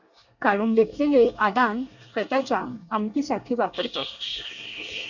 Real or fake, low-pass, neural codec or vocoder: fake; 7.2 kHz; codec, 24 kHz, 1 kbps, SNAC